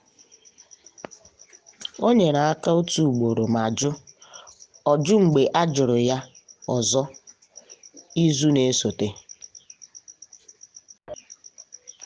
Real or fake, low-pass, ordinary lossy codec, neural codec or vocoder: real; none; none; none